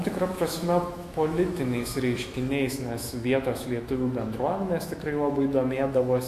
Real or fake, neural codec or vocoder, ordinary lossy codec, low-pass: fake; autoencoder, 48 kHz, 128 numbers a frame, DAC-VAE, trained on Japanese speech; AAC, 64 kbps; 14.4 kHz